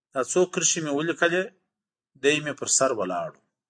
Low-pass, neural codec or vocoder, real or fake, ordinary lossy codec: 9.9 kHz; none; real; MP3, 64 kbps